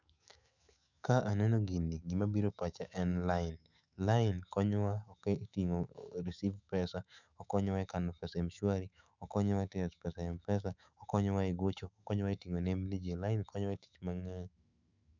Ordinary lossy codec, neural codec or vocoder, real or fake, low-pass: none; autoencoder, 48 kHz, 128 numbers a frame, DAC-VAE, trained on Japanese speech; fake; 7.2 kHz